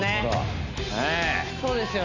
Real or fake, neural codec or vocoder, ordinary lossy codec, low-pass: real; none; none; 7.2 kHz